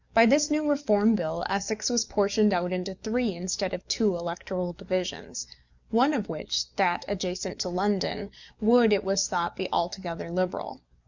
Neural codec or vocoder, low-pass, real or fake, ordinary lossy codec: codec, 44.1 kHz, 7.8 kbps, DAC; 7.2 kHz; fake; Opus, 64 kbps